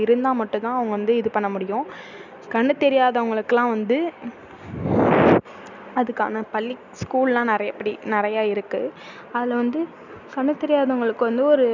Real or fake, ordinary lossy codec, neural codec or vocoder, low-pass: real; none; none; 7.2 kHz